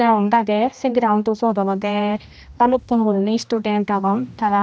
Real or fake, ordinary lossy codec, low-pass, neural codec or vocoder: fake; none; none; codec, 16 kHz, 1 kbps, X-Codec, HuBERT features, trained on general audio